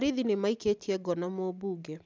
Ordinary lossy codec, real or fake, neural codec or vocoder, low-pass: none; real; none; none